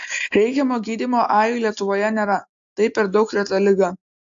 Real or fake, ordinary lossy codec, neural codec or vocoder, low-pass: real; AAC, 64 kbps; none; 7.2 kHz